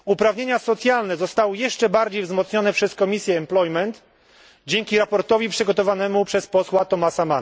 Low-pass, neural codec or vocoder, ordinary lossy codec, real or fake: none; none; none; real